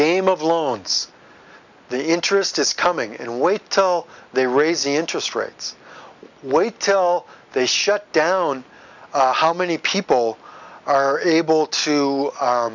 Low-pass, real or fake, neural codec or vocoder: 7.2 kHz; real; none